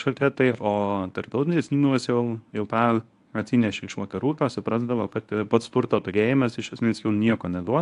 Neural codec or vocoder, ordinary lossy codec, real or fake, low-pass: codec, 24 kHz, 0.9 kbps, WavTokenizer, medium speech release version 1; AAC, 64 kbps; fake; 10.8 kHz